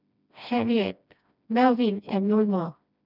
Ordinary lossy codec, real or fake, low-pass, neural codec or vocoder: none; fake; 5.4 kHz; codec, 16 kHz, 1 kbps, FreqCodec, smaller model